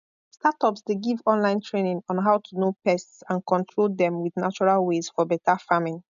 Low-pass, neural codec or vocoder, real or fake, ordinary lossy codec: 7.2 kHz; none; real; none